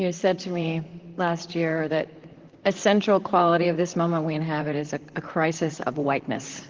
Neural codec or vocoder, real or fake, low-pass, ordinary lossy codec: vocoder, 44.1 kHz, 128 mel bands, Pupu-Vocoder; fake; 7.2 kHz; Opus, 16 kbps